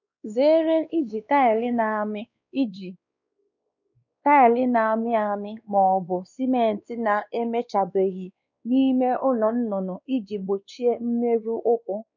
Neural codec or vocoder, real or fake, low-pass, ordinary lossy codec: codec, 16 kHz, 2 kbps, X-Codec, WavLM features, trained on Multilingual LibriSpeech; fake; 7.2 kHz; none